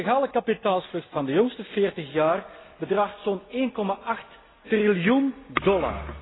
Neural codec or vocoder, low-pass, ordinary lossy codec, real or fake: none; 7.2 kHz; AAC, 16 kbps; real